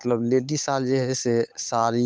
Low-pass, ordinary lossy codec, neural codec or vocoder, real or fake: none; none; codec, 16 kHz, 8 kbps, FunCodec, trained on Chinese and English, 25 frames a second; fake